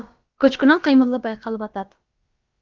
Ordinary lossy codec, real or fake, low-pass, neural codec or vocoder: Opus, 32 kbps; fake; 7.2 kHz; codec, 16 kHz, about 1 kbps, DyCAST, with the encoder's durations